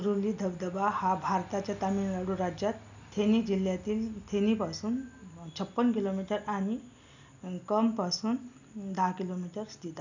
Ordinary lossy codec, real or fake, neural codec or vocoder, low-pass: none; real; none; 7.2 kHz